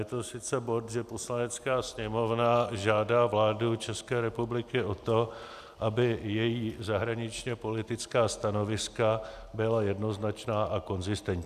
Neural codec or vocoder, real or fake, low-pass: none; real; 14.4 kHz